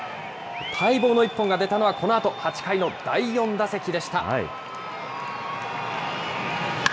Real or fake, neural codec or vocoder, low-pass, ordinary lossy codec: real; none; none; none